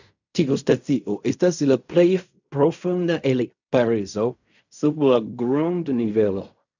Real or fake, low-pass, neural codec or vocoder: fake; 7.2 kHz; codec, 16 kHz in and 24 kHz out, 0.4 kbps, LongCat-Audio-Codec, fine tuned four codebook decoder